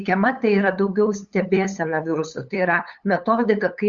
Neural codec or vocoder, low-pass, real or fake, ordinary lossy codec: codec, 16 kHz, 8 kbps, FunCodec, trained on LibriTTS, 25 frames a second; 7.2 kHz; fake; Opus, 64 kbps